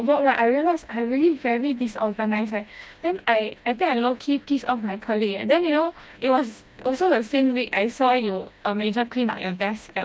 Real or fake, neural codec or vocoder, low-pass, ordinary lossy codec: fake; codec, 16 kHz, 1 kbps, FreqCodec, smaller model; none; none